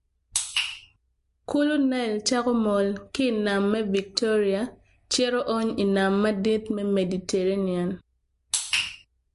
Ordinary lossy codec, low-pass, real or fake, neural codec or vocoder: MP3, 48 kbps; 14.4 kHz; real; none